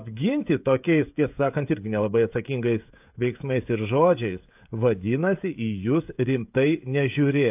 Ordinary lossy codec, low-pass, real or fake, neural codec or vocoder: AAC, 32 kbps; 3.6 kHz; fake; codec, 16 kHz, 16 kbps, FreqCodec, smaller model